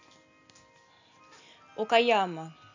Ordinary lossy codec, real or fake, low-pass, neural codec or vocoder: AAC, 48 kbps; real; 7.2 kHz; none